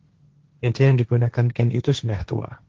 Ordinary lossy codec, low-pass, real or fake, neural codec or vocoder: Opus, 16 kbps; 7.2 kHz; fake; codec, 16 kHz, 1.1 kbps, Voila-Tokenizer